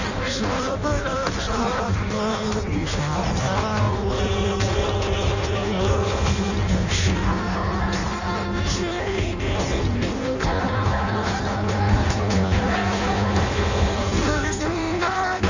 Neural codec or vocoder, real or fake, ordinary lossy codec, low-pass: codec, 16 kHz in and 24 kHz out, 0.6 kbps, FireRedTTS-2 codec; fake; none; 7.2 kHz